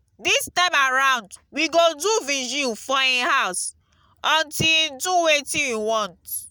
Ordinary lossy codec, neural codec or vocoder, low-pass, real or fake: none; none; none; real